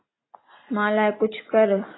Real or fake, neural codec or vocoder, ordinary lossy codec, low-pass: real; none; AAC, 16 kbps; 7.2 kHz